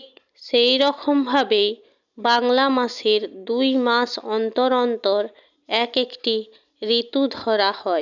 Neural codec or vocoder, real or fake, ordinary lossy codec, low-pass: none; real; none; 7.2 kHz